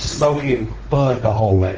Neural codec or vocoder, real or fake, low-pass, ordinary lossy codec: codec, 16 kHz, 4 kbps, X-Codec, WavLM features, trained on Multilingual LibriSpeech; fake; 7.2 kHz; Opus, 16 kbps